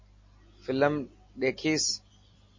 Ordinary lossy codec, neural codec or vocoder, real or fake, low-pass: MP3, 32 kbps; none; real; 7.2 kHz